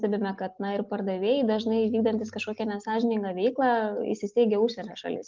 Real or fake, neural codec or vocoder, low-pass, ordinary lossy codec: real; none; 7.2 kHz; Opus, 32 kbps